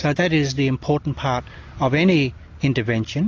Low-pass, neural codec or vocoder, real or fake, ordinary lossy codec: 7.2 kHz; none; real; AAC, 48 kbps